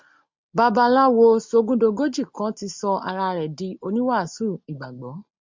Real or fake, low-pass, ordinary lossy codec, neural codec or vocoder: real; 7.2 kHz; MP3, 64 kbps; none